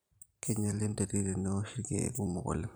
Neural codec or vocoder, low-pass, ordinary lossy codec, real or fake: vocoder, 44.1 kHz, 128 mel bands every 512 samples, BigVGAN v2; none; none; fake